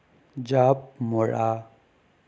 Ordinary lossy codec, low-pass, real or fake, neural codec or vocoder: none; none; real; none